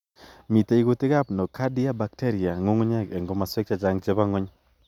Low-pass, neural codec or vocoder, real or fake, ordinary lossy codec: 19.8 kHz; none; real; none